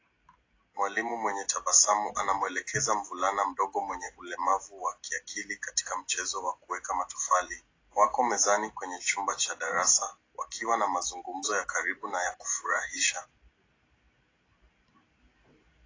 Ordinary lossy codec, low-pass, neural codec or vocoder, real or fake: AAC, 32 kbps; 7.2 kHz; none; real